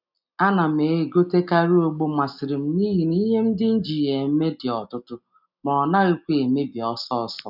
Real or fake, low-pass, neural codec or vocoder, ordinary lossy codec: real; 5.4 kHz; none; none